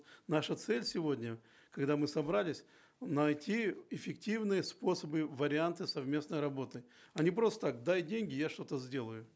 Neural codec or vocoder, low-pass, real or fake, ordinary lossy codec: none; none; real; none